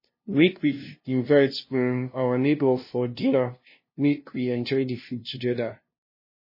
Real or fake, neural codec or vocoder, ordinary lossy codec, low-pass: fake; codec, 16 kHz, 0.5 kbps, FunCodec, trained on LibriTTS, 25 frames a second; MP3, 24 kbps; 5.4 kHz